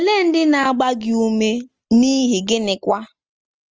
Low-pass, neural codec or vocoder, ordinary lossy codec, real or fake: 7.2 kHz; none; Opus, 24 kbps; real